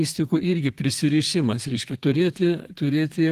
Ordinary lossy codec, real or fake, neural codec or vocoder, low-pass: Opus, 24 kbps; fake; codec, 32 kHz, 1.9 kbps, SNAC; 14.4 kHz